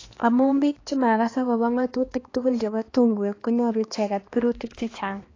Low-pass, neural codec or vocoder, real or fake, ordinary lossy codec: 7.2 kHz; codec, 16 kHz, 2 kbps, X-Codec, HuBERT features, trained on balanced general audio; fake; AAC, 32 kbps